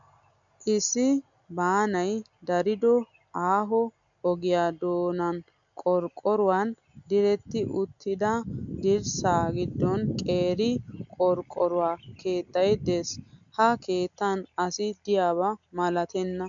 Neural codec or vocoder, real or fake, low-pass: none; real; 7.2 kHz